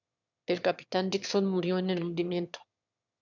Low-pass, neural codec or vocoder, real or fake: 7.2 kHz; autoencoder, 22.05 kHz, a latent of 192 numbers a frame, VITS, trained on one speaker; fake